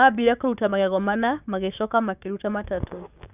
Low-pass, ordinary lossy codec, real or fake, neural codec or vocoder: 3.6 kHz; none; fake; codec, 16 kHz, 6 kbps, DAC